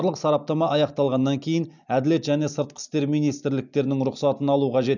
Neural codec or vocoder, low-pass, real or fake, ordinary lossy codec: none; 7.2 kHz; real; none